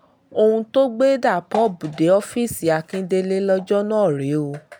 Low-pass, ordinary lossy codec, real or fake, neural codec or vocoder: 19.8 kHz; none; real; none